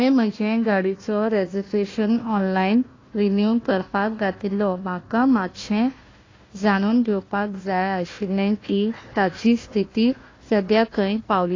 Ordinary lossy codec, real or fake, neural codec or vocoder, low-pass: AAC, 32 kbps; fake; codec, 16 kHz, 1 kbps, FunCodec, trained on Chinese and English, 50 frames a second; 7.2 kHz